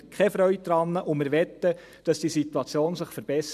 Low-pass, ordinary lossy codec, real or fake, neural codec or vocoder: 14.4 kHz; none; fake; vocoder, 44.1 kHz, 128 mel bands every 512 samples, BigVGAN v2